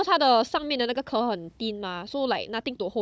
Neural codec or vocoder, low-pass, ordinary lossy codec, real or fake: codec, 16 kHz, 16 kbps, FunCodec, trained on Chinese and English, 50 frames a second; none; none; fake